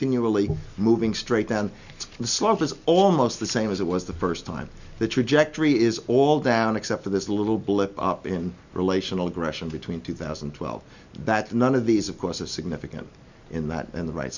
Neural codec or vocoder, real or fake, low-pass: none; real; 7.2 kHz